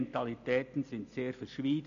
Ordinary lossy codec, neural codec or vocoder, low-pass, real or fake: none; none; 7.2 kHz; real